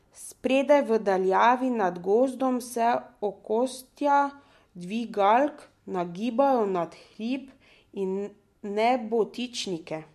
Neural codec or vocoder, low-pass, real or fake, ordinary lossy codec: none; 14.4 kHz; real; MP3, 64 kbps